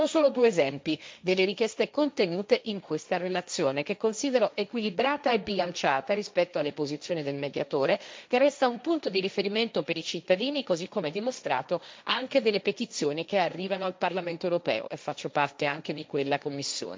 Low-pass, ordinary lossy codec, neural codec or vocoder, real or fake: none; none; codec, 16 kHz, 1.1 kbps, Voila-Tokenizer; fake